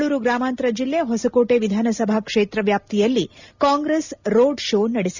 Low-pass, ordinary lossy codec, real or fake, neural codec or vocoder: 7.2 kHz; none; real; none